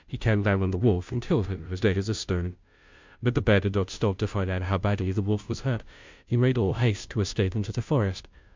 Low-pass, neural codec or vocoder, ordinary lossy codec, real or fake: 7.2 kHz; codec, 16 kHz, 0.5 kbps, FunCodec, trained on Chinese and English, 25 frames a second; MP3, 64 kbps; fake